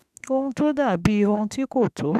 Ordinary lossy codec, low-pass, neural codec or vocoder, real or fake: none; 14.4 kHz; autoencoder, 48 kHz, 32 numbers a frame, DAC-VAE, trained on Japanese speech; fake